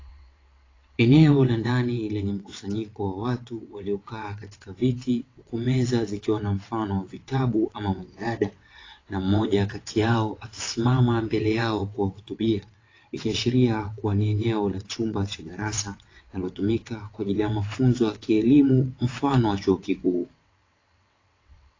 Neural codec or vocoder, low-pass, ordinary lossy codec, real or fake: vocoder, 22.05 kHz, 80 mel bands, WaveNeXt; 7.2 kHz; AAC, 32 kbps; fake